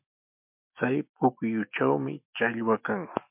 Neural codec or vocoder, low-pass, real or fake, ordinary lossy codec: autoencoder, 48 kHz, 128 numbers a frame, DAC-VAE, trained on Japanese speech; 3.6 kHz; fake; MP3, 32 kbps